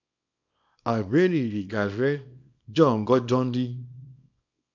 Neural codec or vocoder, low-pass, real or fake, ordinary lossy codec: codec, 24 kHz, 0.9 kbps, WavTokenizer, small release; 7.2 kHz; fake; AAC, 48 kbps